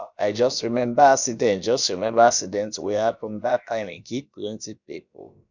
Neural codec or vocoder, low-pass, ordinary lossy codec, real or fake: codec, 16 kHz, about 1 kbps, DyCAST, with the encoder's durations; 7.2 kHz; none; fake